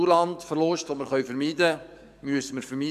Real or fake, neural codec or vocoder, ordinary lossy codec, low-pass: fake; codec, 44.1 kHz, 7.8 kbps, DAC; none; 14.4 kHz